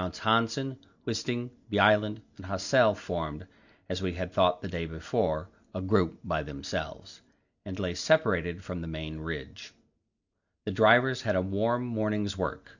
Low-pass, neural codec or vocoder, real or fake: 7.2 kHz; none; real